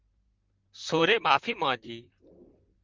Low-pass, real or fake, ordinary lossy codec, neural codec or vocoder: 7.2 kHz; fake; Opus, 32 kbps; vocoder, 44.1 kHz, 128 mel bands, Pupu-Vocoder